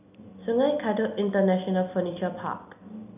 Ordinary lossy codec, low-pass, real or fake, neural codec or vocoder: none; 3.6 kHz; real; none